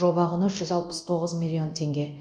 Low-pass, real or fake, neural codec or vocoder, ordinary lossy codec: 9.9 kHz; fake; codec, 24 kHz, 0.9 kbps, DualCodec; none